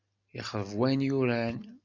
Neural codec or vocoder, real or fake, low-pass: none; real; 7.2 kHz